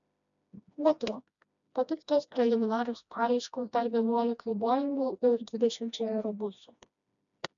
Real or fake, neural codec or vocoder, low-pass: fake; codec, 16 kHz, 1 kbps, FreqCodec, smaller model; 7.2 kHz